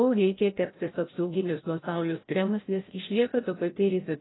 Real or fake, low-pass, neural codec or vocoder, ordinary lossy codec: fake; 7.2 kHz; codec, 16 kHz, 0.5 kbps, FreqCodec, larger model; AAC, 16 kbps